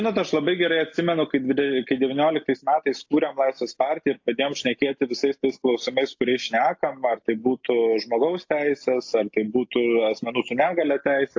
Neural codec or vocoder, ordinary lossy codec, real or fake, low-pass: none; AAC, 48 kbps; real; 7.2 kHz